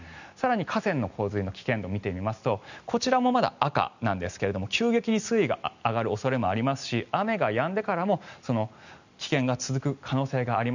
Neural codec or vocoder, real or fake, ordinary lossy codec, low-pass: none; real; none; 7.2 kHz